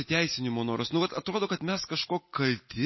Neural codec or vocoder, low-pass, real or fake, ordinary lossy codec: none; 7.2 kHz; real; MP3, 24 kbps